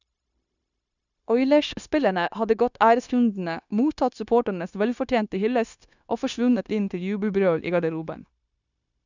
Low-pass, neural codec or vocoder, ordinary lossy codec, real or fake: 7.2 kHz; codec, 16 kHz, 0.9 kbps, LongCat-Audio-Codec; none; fake